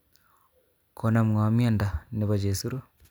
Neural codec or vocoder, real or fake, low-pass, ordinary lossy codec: none; real; none; none